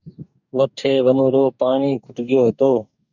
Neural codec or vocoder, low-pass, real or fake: codec, 44.1 kHz, 2.6 kbps, DAC; 7.2 kHz; fake